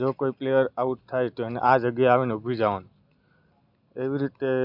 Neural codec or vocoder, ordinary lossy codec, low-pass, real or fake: none; none; 5.4 kHz; real